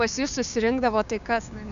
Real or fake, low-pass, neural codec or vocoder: fake; 7.2 kHz; codec, 16 kHz, 6 kbps, DAC